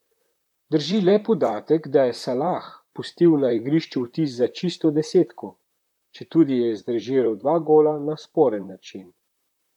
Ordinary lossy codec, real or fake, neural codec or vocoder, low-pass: none; fake; vocoder, 44.1 kHz, 128 mel bands, Pupu-Vocoder; 19.8 kHz